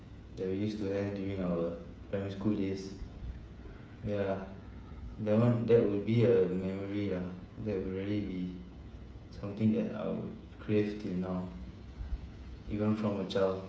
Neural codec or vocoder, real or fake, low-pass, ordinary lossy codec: codec, 16 kHz, 8 kbps, FreqCodec, smaller model; fake; none; none